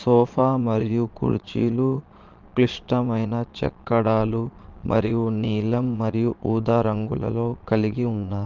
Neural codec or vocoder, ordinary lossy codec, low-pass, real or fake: vocoder, 22.05 kHz, 80 mel bands, Vocos; Opus, 32 kbps; 7.2 kHz; fake